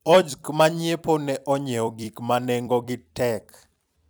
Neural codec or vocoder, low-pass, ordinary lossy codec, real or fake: vocoder, 44.1 kHz, 128 mel bands every 256 samples, BigVGAN v2; none; none; fake